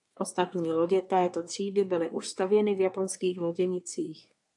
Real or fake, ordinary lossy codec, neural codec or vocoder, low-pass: fake; AAC, 64 kbps; codec, 24 kHz, 1 kbps, SNAC; 10.8 kHz